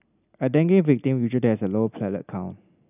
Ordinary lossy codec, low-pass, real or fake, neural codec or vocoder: none; 3.6 kHz; real; none